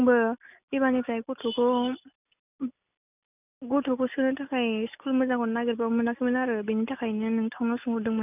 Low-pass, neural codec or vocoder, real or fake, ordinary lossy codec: 3.6 kHz; none; real; none